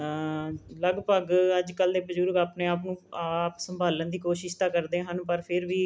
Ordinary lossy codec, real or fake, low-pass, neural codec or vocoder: none; real; none; none